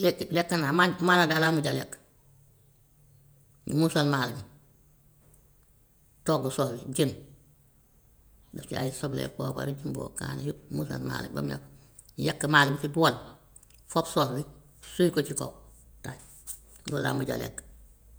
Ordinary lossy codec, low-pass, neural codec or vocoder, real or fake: none; none; vocoder, 48 kHz, 128 mel bands, Vocos; fake